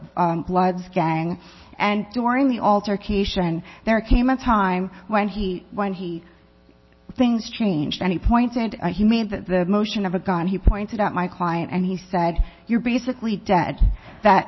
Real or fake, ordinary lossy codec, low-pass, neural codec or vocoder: real; MP3, 24 kbps; 7.2 kHz; none